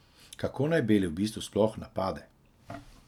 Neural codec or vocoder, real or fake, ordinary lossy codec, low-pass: none; real; none; 19.8 kHz